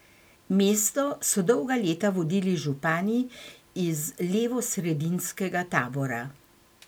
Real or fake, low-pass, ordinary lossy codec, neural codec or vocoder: real; none; none; none